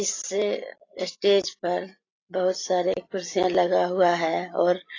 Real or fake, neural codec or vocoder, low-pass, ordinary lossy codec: real; none; 7.2 kHz; AAC, 32 kbps